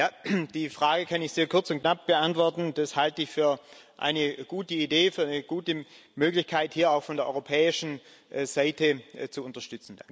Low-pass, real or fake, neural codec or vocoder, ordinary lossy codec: none; real; none; none